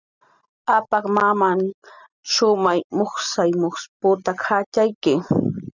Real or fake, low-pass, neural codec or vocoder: real; 7.2 kHz; none